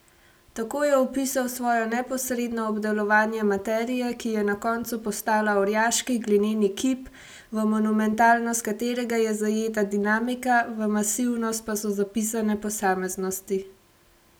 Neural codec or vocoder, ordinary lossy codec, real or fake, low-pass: none; none; real; none